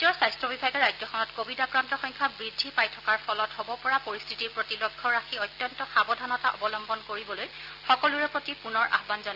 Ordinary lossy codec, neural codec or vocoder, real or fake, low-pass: Opus, 24 kbps; none; real; 5.4 kHz